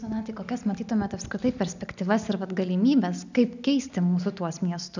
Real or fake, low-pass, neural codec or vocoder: real; 7.2 kHz; none